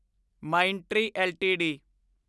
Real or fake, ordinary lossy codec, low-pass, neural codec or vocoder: real; none; none; none